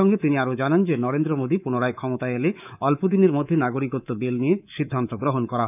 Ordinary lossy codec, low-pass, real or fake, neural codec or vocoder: none; 3.6 kHz; fake; codec, 24 kHz, 3.1 kbps, DualCodec